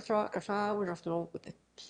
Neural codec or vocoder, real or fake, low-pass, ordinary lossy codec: autoencoder, 22.05 kHz, a latent of 192 numbers a frame, VITS, trained on one speaker; fake; 9.9 kHz; Opus, 64 kbps